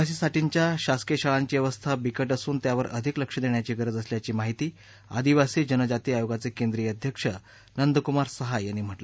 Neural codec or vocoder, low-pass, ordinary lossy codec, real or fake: none; none; none; real